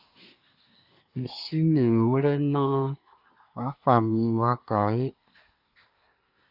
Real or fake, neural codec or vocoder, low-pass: fake; codec, 24 kHz, 1 kbps, SNAC; 5.4 kHz